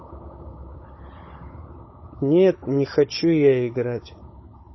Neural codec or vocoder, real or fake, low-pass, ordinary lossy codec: codec, 16 kHz, 16 kbps, FunCodec, trained on Chinese and English, 50 frames a second; fake; 7.2 kHz; MP3, 24 kbps